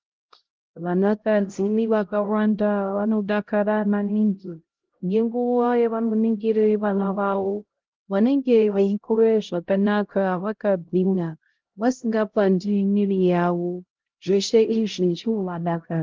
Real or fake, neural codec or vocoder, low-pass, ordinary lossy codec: fake; codec, 16 kHz, 0.5 kbps, X-Codec, HuBERT features, trained on LibriSpeech; 7.2 kHz; Opus, 16 kbps